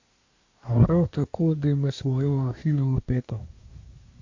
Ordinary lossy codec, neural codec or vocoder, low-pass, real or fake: AAC, 48 kbps; codec, 24 kHz, 1 kbps, SNAC; 7.2 kHz; fake